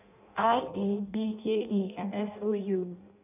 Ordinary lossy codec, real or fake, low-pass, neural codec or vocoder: none; fake; 3.6 kHz; codec, 16 kHz in and 24 kHz out, 0.6 kbps, FireRedTTS-2 codec